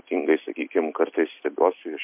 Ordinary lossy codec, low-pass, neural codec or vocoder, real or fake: MP3, 32 kbps; 3.6 kHz; none; real